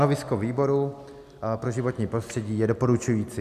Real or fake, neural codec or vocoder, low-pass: real; none; 14.4 kHz